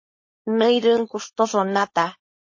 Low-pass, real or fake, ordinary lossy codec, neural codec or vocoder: 7.2 kHz; fake; MP3, 32 kbps; codec, 16 kHz, 4.8 kbps, FACodec